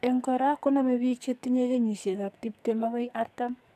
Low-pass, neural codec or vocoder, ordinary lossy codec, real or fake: 14.4 kHz; codec, 44.1 kHz, 2.6 kbps, SNAC; none; fake